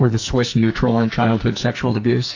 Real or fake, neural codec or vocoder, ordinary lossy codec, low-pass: fake; codec, 44.1 kHz, 2.6 kbps, SNAC; AAC, 48 kbps; 7.2 kHz